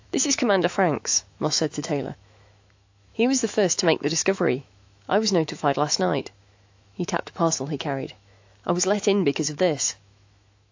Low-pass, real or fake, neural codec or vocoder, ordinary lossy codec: 7.2 kHz; fake; autoencoder, 48 kHz, 128 numbers a frame, DAC-VAE, trained on Japanese speech; AAC, 48 kbps